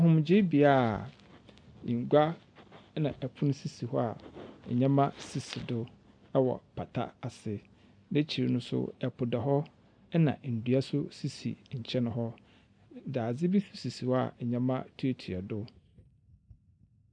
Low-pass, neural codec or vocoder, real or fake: 9.9 kHz; none; real